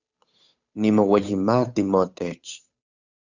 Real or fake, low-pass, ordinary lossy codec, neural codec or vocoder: fake; 7.2 kHz; Opus, 64 kbps; codec, 16 kHz, 8 kbps, FunCodec, trained on Chinese and English, 25 frames a second